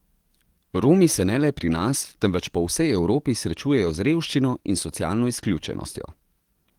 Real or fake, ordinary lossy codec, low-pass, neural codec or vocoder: fake; Opus, 24 kbps; 19.8 kHz; codec, 44.1 kHz, 7.8 kbps, DAC